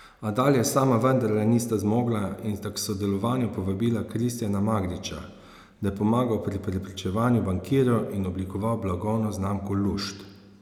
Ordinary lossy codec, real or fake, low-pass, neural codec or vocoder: none; real; 19.8 kHz; none